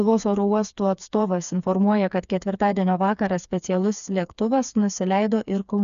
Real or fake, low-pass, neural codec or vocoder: fake; 7.2 kHz; codec, 16 kHz, 4 kbps, FreqCodec, smaller model